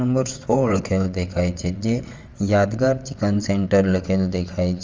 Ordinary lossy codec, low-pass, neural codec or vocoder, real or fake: Opus, 32 kbps; 7.2 kHz; vocoder, 22.05 kHz, 80 mel bands, WaveNeXt; fake